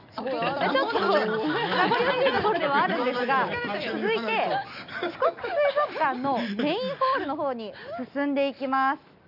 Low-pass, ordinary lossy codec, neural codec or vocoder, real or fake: 5.4 kHz; none; none; real